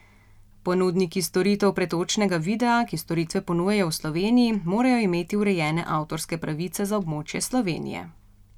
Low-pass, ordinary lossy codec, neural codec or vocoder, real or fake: 19.8 kHz; none; none; real